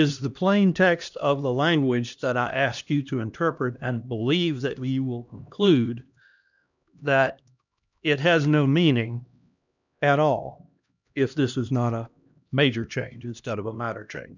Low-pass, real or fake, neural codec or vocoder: 7.2 kHz; fake; codec, 16 kHz, 1 kbps, X-Codec, HuBERT features, trained on LibriSpeech